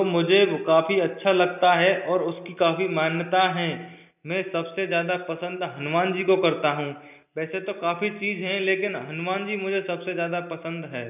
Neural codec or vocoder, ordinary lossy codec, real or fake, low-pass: none; none; real; 3.6 kHz